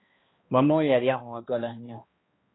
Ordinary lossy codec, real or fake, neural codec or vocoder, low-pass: AAC, 16 kbps; fake; codec, 16 kHz, 1 kbps, X-Codec, HuBERT features, trained on balanced general audio; 7.2 kHz